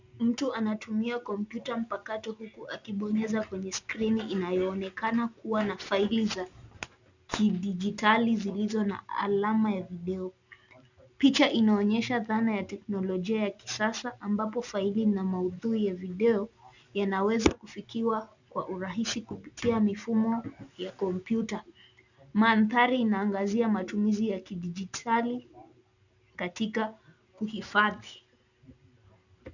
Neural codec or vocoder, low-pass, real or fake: none; 7.2 kHz; real